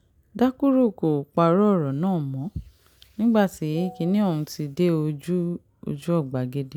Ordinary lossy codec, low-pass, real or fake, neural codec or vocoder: none; 19.8 kHz; real; none